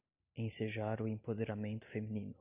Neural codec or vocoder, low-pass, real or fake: none; 3.6 kHz; real